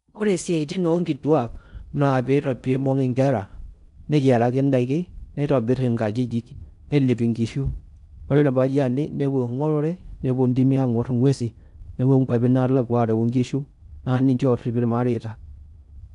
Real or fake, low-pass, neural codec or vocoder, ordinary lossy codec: fake; 10.8 kHz; codec, 16 kHz in and 24 kHz out, 0.6 kbps, FocalCodec, streaming, 4096 codes; none